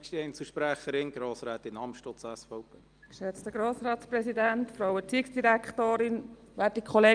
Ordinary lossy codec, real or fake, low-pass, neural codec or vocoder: Opus, 32 kbps; real; 9.9 kHz; none